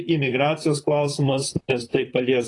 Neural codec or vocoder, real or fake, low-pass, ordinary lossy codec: vocoder, 44.1 kHz, 128 mel bands, Pupu-Vocoder; fake; 10.8 kHz; AAC, 32 kbps